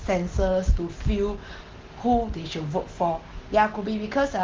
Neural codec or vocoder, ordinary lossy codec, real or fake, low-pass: none; Opus, 16 kbps; real; 7.2 kHz